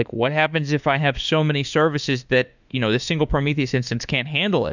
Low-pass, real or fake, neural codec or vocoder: 7.2 kHz; fake; autoencoder, 48 kHz, 32 numbers a frame, DAC-VAE, trained on Japanese speech